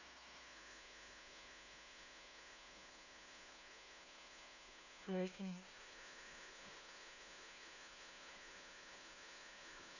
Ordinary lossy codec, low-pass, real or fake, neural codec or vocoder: none; 7.2 kHz; fake; codec, 16 kHz, 1 kbps, FunCodec, trained on LibriTTS, 50 frames a second